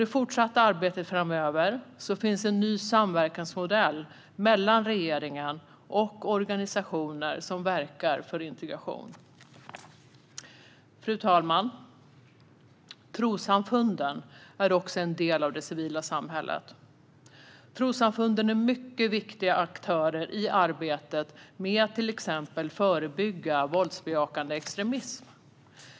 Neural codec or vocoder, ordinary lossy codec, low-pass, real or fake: none; none; none; real